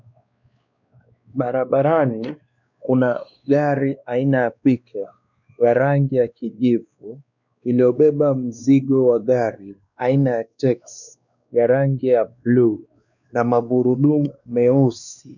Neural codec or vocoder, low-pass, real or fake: codec, 16 kHz, 2 kbps, X-Codec, WavLM features, trained on Multilingual LibriSpeech; 7.2 kHz; fake